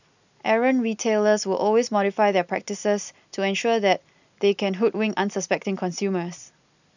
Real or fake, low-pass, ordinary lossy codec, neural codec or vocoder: real; 7.2 kHz; none; none